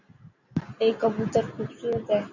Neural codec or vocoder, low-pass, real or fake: none; 7.2 kHz; real